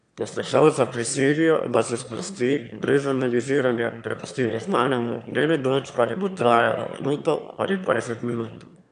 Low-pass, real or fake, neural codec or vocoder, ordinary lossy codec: 9.9 kHz; fake; autoencoder, 22.05 kHz, a latent of 192 numbers a frame, VITS, trained on one speaker; none